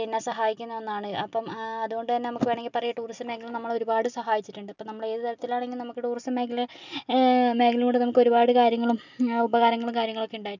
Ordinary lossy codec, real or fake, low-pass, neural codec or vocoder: none; real; 7.2 kHz; none